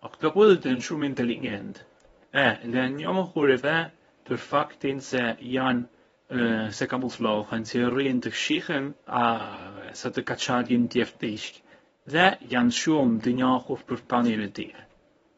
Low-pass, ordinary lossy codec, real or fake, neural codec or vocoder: 10.8 kHz; AAC, 24 kbps; fake; codec, 24 kHz, 0.9 kbps, WavTokenizer, medium speech release version 1